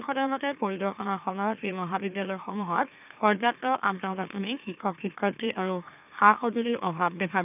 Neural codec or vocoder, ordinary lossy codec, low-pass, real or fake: autoencoder, 44.1 kHz, a latent of 192 numbers a frame, MeloTTS; none; 3.6 kHz; fake